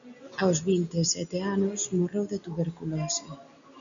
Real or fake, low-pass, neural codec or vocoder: real; 7.2 kHz; none